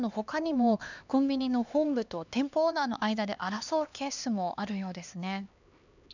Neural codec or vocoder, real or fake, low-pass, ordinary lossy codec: codec, 16 kHz, 2 kbps, X-Codec, HuBERT features, trained on LibriSpeech; fake; 7.2 kHz; none